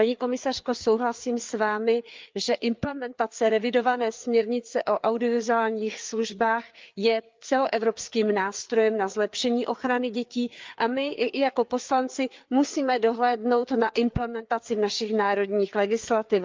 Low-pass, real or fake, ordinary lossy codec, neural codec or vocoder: 7.2 kHz; fake; Opus, 24 kbps; codec, 16 kHz, 4 kbps, FreqCodec, larger model